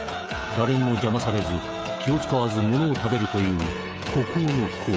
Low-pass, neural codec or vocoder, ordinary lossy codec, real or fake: none; codec, 16 kHz, 16 kbps, FreqCodec, smaller model; none; fake